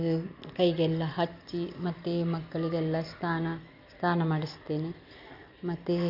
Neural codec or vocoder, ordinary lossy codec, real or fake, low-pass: none; none; real; 5.4 kHz